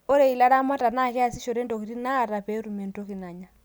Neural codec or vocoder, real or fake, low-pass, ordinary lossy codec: none; real; none; none